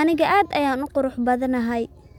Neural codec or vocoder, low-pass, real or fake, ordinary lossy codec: none; 19.8 kHz; real; none